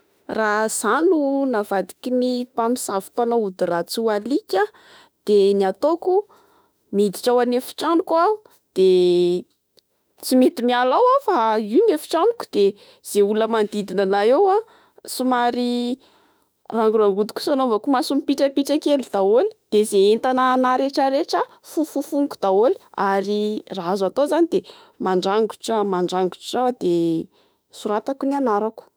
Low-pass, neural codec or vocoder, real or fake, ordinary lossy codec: none; autoencoder, 48 kHz, 32 numbers a frame, DAC-VAE, trained on Japanese speech; fake; none